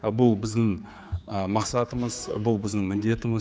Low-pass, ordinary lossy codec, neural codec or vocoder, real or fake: none; none; codec, 16 kHz, 4 kbps, X-Codec, HuBERT features, trained on general audio; fake